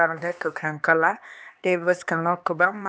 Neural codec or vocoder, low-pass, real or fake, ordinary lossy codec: codec, 16 kHz, 2 kbps, X-Codec, HuBERT features, trained on LibriSpeech; none; fake; none